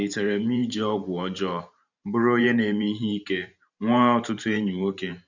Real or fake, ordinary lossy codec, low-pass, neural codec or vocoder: fake; none; 7.2 kHz; vocoder, 44.1 kHz, 128 mel bands every 512 samples, BigVGAN v2